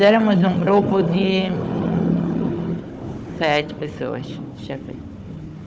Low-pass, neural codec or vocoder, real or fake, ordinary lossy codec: none; codec, 16 kHz, 4 kbps, FunCodec, trained on Chinese and English, 50 frames a second; fake; none